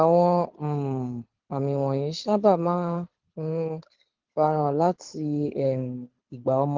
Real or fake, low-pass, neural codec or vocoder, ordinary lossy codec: fake; 7.2 kHz; codec, 24 kHz, 6 kbps, HILCodec; Opus, 16 kbps